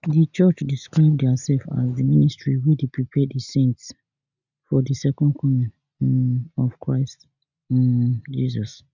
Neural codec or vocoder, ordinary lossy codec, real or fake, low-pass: none; none; real; 7.2 kHz